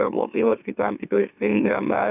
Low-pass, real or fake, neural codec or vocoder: 3.6 kHz; fake; autoencoder, 44.1 kHz, a latent of 192 numbers a frame, MeloTTS